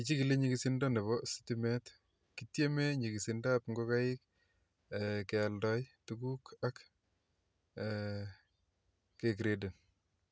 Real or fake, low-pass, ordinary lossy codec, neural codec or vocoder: real; none; none; none